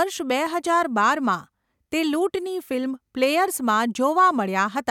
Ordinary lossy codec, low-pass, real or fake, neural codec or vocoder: none; 19.8 kHz; real; none